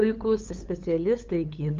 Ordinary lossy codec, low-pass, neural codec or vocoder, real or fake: Opus, 24 kbps; 7.2 kHz; codec, 16 kHz, 8 kbps, FunCodec, trained on LibriTTS, 25 frames a second; fake